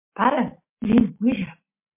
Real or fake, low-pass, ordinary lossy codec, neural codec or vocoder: real; 3.6 kHz; MP3, 24 kbps; none